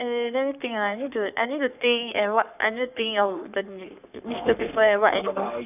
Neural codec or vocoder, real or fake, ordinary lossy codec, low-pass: codec, 44.1 kHz, 3.4 kbps, Pupu-Codec; fake; none; 3.6 kHz